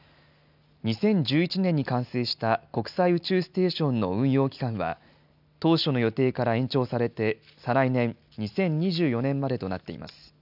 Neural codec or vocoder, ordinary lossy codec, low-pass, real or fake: none; none; 5.4 kHz; real